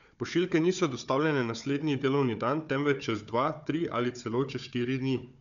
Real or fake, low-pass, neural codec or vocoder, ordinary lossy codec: fake; 7.2 kHz; codec, 16 kHz, 4 kbps, FunCodec, trained on Chinese and English, 50 frames a second; none